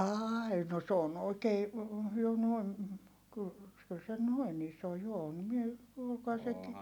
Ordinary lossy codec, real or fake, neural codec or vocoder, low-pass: none; real; none; 19.8 kHz